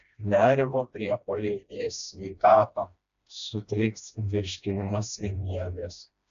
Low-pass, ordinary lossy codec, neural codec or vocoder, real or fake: 7.2 kHz; AAC, 64 kbps; codec, 16 kHz, 1 kbps, FreqCodec, smaller model; fake